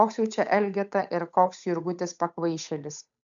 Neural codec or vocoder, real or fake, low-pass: codec, 16 kHz, 8 kbps, FunCodec, trained on Chinese and English, 25 frames a second; fake; 7.2 kHz